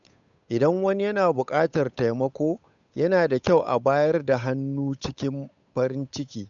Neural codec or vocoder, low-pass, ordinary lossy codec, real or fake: codec, 16 kHz, 8 kbps, FunCodec, trained on Chinese and English, 25 frames a second; 7.2 kHz; none; fake